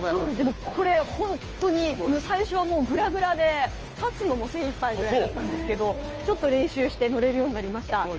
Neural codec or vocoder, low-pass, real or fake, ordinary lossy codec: codec, 16 kHz, 2 kbps, FunCodec, trained on Chinese and English, 25 frames a second; 7.2 kHz; fake; Opus, 24 kbps